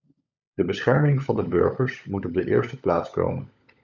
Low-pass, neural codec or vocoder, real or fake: 7.2 kHz; codec, 16 kHz, 16 kbps, FunCodec, trained on LibriTTS, 50 frames a second; fake